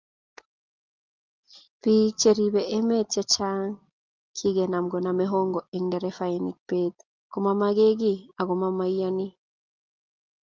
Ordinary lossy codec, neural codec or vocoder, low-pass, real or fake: Opus, 32 kbps; none; 7.2 kHz; real